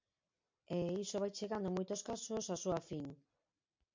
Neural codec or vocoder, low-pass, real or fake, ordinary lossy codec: none; 7.2 kHz; real; MP3, 48 kbps